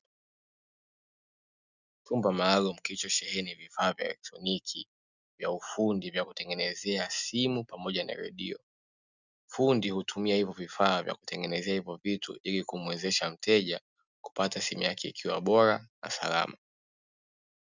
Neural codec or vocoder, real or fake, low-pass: none; real; 7.2 kHz